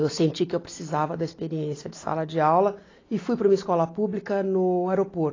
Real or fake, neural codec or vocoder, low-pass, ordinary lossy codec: real; none; 7.2 kHz; AAC, 32 kbps